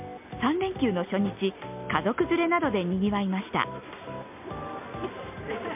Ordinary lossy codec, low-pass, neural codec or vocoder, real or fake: MP3, 32 kbps; 3.6 kHz; none; real